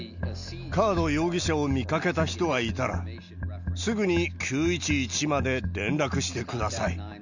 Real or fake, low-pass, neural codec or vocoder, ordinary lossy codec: real; 7.2 kHz; none; none